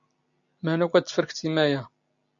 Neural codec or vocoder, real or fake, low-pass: none; real; 7.2 kHz